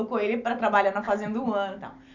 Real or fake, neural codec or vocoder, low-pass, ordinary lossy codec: real; none; 7.2 kHz; none